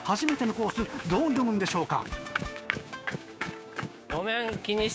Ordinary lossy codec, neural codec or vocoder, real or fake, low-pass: none; codec, 16 kHz, 6 kbps, DAC; fake; none